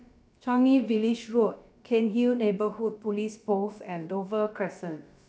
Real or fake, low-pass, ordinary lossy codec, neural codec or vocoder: fake; none; none; codec, 16 kHz, about 1 kbps, DyCAST, with the encoder's durations